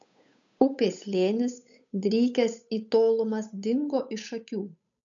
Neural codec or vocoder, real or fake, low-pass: codec, 16 kHz, 8 kbps, FunCodec, trained on Chinese and English, 25 frames a second; fake; 7.2 kHz